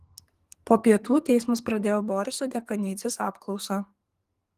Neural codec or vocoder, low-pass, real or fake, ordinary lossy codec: codec, 44.1 kHz, 2.6 kbps, SNAC; 14.4 kHz; fake; Opus, 24 kbps